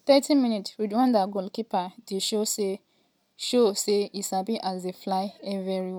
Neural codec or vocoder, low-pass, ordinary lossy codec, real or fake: none; none; none; real